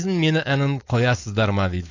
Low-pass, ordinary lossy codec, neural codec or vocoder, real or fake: 7.2 kHz; AAC, 48 kbps; codec, 16 kHz, 4.8 kbps, FACodec; fake